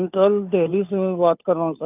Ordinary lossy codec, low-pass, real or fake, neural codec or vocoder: none; 3.6 kHz; fake; codec, 16 kHz, 2 kbps, FunCodec, trained on Chinese and English, 25 frames a second